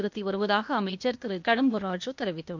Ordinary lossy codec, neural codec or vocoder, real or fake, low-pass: MP3, 48 kbps; codec, 16 kHz, 0.8 kbps, ZipCodec; fake; 7.2 kHz